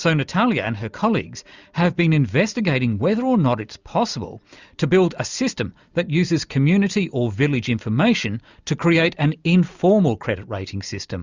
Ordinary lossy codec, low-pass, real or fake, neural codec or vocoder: Opus, 64 kbps; 7.2 kHz; real; none